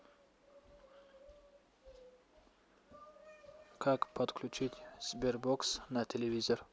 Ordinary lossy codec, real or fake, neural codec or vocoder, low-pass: none; real; none; none